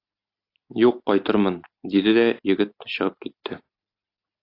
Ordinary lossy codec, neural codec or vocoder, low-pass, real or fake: AAC, 32 kbps; none; 5.4 kHz; real